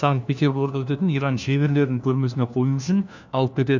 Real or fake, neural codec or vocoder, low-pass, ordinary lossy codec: fake; codec, 16 kHz, 1 kbps, FunCodec, trained on LibriTTS, 50 frames a second; 7.2 kHz; none